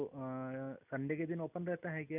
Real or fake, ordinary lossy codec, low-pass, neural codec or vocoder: real; none; 3.6 kHz; none